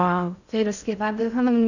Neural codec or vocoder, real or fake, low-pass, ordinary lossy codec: codec, 16 kHz in and 24 kHz out, 0.6 kbps, FocalCodec, streaming, 4096 codes; fake; 7.2 kHz; none